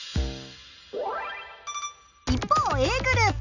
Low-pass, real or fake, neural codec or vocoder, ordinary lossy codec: 7.2 kHz; real; none; none